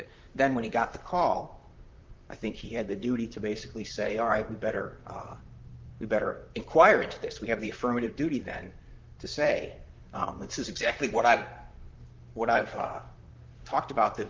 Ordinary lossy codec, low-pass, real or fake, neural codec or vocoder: Opus, 32 kbps; 7.2 kHz; fake; vocoder, 44.1 kHz, 128 mel bands, Pupu-Vocoder